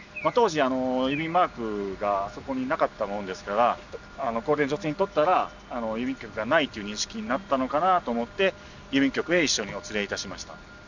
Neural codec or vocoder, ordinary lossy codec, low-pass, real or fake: none; none; 7.2 kHz; real